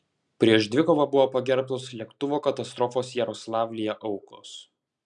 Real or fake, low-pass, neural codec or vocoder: real; 10.8 kHz; none